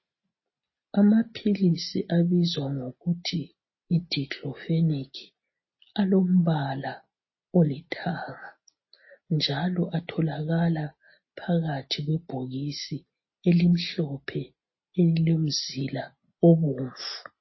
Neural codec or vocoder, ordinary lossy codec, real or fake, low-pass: none; MP3, 24 kbps; real; 7.2 kHz